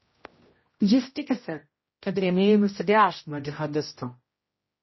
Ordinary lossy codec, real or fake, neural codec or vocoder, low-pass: MP3, 24 kbps; fake; codec, 16 kHz, 0.5 kbps, X-Codec, HuBERT features, trained on general audio; 7.2 kHz